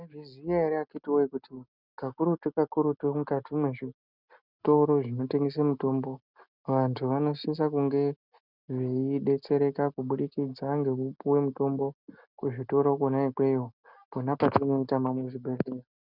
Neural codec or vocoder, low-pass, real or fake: none; 5.4 kHz; real